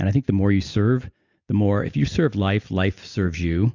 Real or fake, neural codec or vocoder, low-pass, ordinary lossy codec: real; none; 7.2 kHz; Opus, 64 kbps